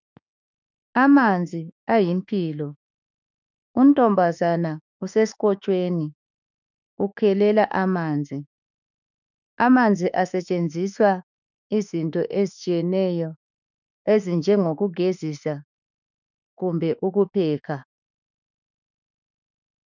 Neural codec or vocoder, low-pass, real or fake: autoencoder, 48 kHz, 32 numbers a frame, DAC-VAE, trained on Japanese speech; 7.2 kHz; fake